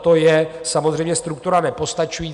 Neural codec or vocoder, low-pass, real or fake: none; 10.8 kHz; real